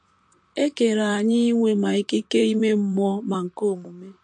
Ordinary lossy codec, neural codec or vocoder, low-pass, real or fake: MP3, 48 kbps; vocoder, 44.1 kHz, 128 mel bands, Pupu-Vocoder; 10.8 kHz; fake